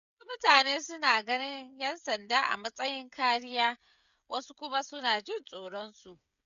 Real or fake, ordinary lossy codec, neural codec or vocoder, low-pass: fake; none; codec, 16 kHz, 16 kbps, FreqCodec, smaller model; 7.2 kHz